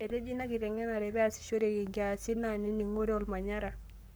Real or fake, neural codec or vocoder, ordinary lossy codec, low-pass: fake; codec, 44.1 kHz, 7.8 kbps, Pupu-Codec; none; none